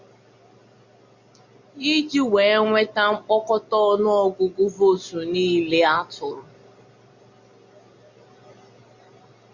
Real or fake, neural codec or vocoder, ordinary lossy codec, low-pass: real; none; Opus, 64 kbps; 7.2 kHz